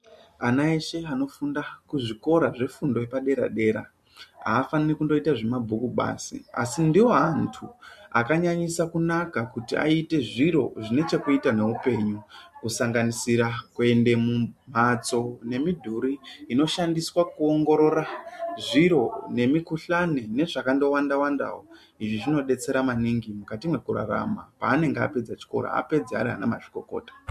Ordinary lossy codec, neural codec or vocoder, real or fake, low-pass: MP3, 64 kbps; none; real; 14.4 kHz